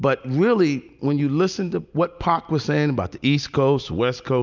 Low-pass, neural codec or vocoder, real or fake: 7.2 kHz; none; real